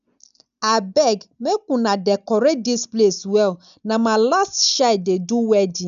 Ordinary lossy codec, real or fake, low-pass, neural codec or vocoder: none; real; 7.2 kHz; none